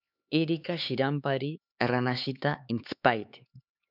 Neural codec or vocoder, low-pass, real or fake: codec, 16 kHz, 4 kbps, X-Codec, HuBERT features, trained on LibriSpeech; 5.4 kHz; fake